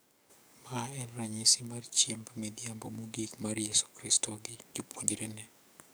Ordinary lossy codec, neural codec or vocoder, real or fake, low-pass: none; codec, 44.1 kHz, 7.8 kbps, DAC; fake; none